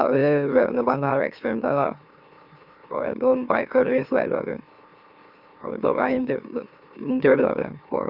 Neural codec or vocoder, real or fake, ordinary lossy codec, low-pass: autoencoder, 44.1 kHz, a latent of 192 numbers a frame, MeloTTS; fake; Opus, 64 kbps; 5.4 kHz